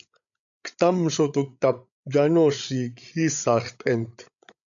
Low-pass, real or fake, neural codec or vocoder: 7.2 kHz; fake; codec, 16 kHz, 8 kbps, FreqCodec, larger model